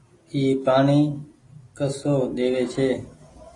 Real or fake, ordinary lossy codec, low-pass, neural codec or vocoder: real; AAC, 32 kbps; 10.8 kHz; none